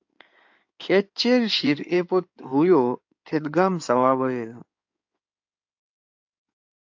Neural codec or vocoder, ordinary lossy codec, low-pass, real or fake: codec, 16 kHz in and 24 kHz out, 2.2 kbps, FireRedTTS-2 codec; AAC, 48 kbps; 7.2 kHz; fake